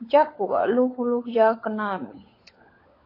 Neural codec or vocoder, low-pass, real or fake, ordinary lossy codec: codec, 16 kHz, 16 kbps, FunCodec, trained on LibriTTS, 50 frames a second; 5.4 kHz; fake; AAC, 24 kbps